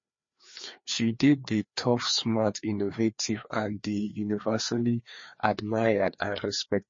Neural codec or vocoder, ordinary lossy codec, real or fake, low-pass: codec, 16 kHz, 2 kbps, FreqCodec, larger model; MP3, 32 kbps; fake; 7.2 kHz